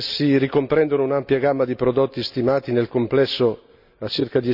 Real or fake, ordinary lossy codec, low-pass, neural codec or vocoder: real; none; 5.4 kHz; none